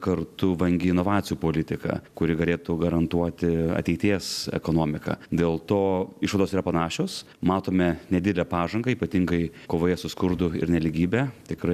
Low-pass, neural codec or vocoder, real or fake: 14.4 kHz; none; real